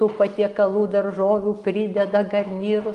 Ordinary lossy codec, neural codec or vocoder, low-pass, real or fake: Opus, 24 kbps; none; 10.8 kHz; real